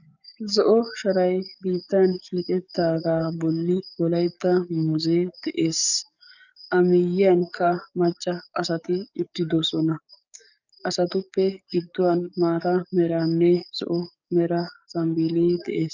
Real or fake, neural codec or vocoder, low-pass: fake; codec, 44.1 kHz, 7.8 kbps, DAC; 7.2 kHz